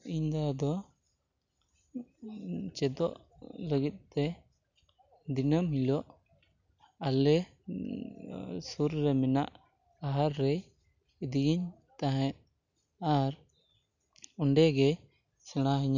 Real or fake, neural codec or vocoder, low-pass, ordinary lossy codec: real; none; 7.2 kHz; none